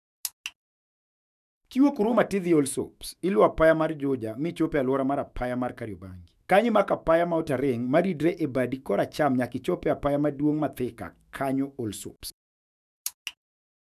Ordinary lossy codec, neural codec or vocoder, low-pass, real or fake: none; autoencoder, 48 kHz, 128 numbers a frame, DAC-VAE, trained on Japanese speech; 14.4 kHz; fake